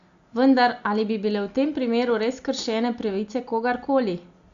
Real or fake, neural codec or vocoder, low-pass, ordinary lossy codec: real; none; 7.2 kHz; Opus, 64 kbps